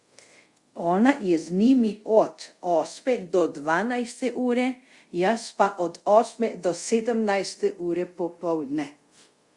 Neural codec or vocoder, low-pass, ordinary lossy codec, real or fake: codec, 24 kHz, 0.5 kbps, DualCodec; 10.8 kHz; Opus, 64 kbps; fake